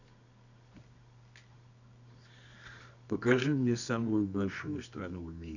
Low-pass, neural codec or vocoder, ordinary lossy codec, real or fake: 7.2 kHz; codec, 24 kHz, 0.9 kbps, WavTokenizer, medium music audio release; none; fake